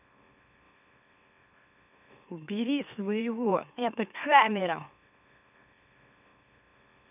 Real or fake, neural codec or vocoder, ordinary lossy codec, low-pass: fake; autoencoder, 44.1 kHz, a latent of 192 numbers a frame, MeloTTS; none; 3.6 kHz